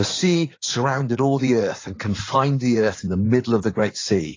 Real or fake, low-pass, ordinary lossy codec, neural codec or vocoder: fake; 7.2 kHz; AAC, 32 kbps; codec, 16 kHz in and 24 kHz out, 2.2 kbps, FireRedTTS-2 codec